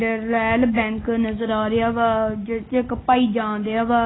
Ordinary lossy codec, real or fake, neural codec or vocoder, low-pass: AAC, 16 kbps; real; none; 7.2 kHz